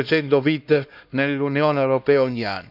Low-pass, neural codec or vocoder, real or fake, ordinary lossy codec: 5.4 kHz; codec, 16 kHz, 1 kbps, X-Codec, HuBERT features, trained on LibriSpeech; fake; none